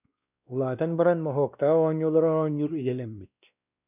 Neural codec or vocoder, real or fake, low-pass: codec, 16 kHz, 1 kbps, X-Codec, WavLM features, trained on Multilingual LibriSpeech; fake; 3.6 kHz